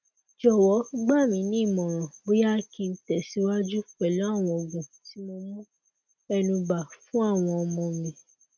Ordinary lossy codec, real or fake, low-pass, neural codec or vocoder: none; real; none; none